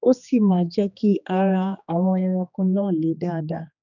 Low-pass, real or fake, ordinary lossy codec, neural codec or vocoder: 7.2 kHz; fake; none; codec, 16 kHz, 2 kbps, X-Codec, HuBERT features, trained on general audio